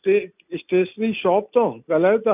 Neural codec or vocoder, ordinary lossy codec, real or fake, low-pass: none; none; real; 3.6 kHz